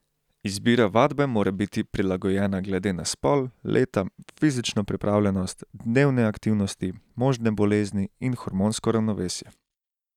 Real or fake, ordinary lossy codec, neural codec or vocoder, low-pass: real; none; none; 19.8 kHz